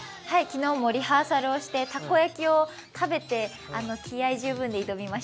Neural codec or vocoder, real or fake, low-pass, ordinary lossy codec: none; real; none; none